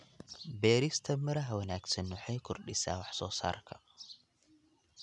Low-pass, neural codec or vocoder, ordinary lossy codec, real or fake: 10.8 kHz; none; none; real